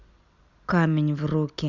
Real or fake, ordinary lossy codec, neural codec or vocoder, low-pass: real; Opus, 64 kbps; none; 7.2 kHz